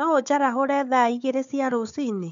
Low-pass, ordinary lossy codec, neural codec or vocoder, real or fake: 7.2 kHz; MP3, 64 kbps; codec, 16 kHz, 4 kbps, FunCodec, trained on Chinese and English, 50 frames a second; fake